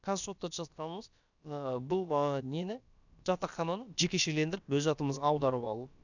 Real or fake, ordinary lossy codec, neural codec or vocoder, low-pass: fake; none; codec, 16 kHz, about 1 kbps, DyCAST, with the encoder's durations; 7.2 kHz